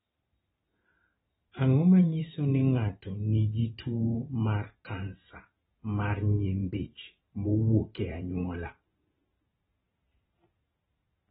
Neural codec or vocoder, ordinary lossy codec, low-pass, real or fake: vocoder, 44.1 kHz, 128 mel bands every 512 samples, BigVGAN v2; AAC, 16 kbps; 19.8 kHz; fake